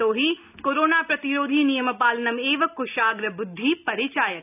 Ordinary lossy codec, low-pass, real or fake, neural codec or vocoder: none; 3.6 kHz; real; none